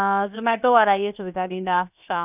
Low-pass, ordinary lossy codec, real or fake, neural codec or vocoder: 3.6 kHz; none; fake; codec, 16 kHz, 0.7 kbps, FocalCodec